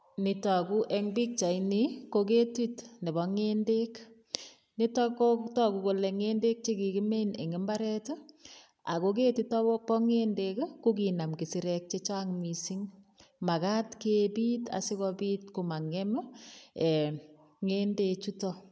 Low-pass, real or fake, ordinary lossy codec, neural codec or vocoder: none; real; none; none